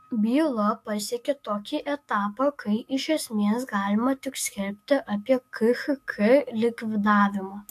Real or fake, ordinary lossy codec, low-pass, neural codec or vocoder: fake; AAC, 64 kbps; 14.4 kHz; autoencoder, 48 kHz, 128 numbers a frame, DAC-VAE, trained on Japanese speech